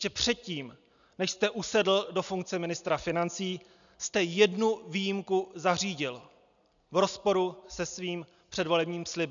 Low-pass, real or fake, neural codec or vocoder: 7.2 kHz; real; none